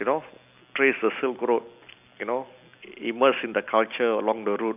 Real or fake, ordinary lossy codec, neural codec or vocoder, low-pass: real; none; none; 3.6 kHz